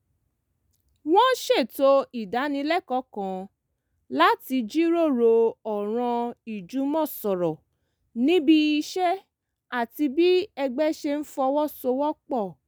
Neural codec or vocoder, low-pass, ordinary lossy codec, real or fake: none; none; none; real